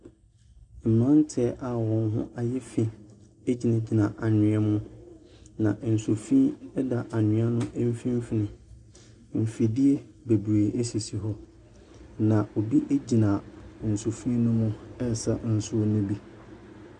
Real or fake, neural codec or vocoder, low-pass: real; none; 10.8 kHz